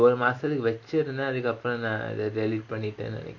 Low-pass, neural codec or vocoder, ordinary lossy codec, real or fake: 7.2 kHz; none; none; real